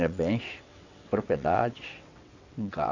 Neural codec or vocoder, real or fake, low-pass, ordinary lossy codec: vocoder, 22.05 kHz, 80 mel bands, WaveNeXt; fake; 7.2 kHz; Opus, 64 kbps